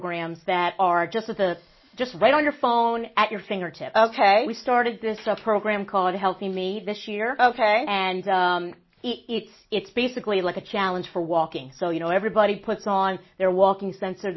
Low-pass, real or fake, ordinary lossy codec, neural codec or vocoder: 7.2 kHz; real; MP3, 24 kbps; none